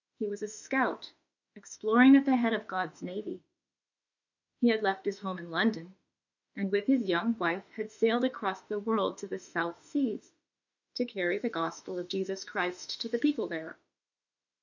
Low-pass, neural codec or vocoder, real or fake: 7.2 kHz; autoencoder, 48 kHz, 32 numbers a frame, DAC-VAE, trained on Japanese speech; fake